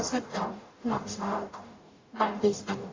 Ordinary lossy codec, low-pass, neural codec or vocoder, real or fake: AAC, 32 kbps; 7.2 kHz; codec, 44.1 kHz, 0.9 kbps, DAC; fake